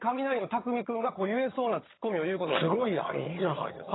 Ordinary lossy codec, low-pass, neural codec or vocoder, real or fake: AAC, 16 kbps; 7.2 kHz; vocoder, 22.05 kHz, 80 mel bands, HiFi-GAN; fake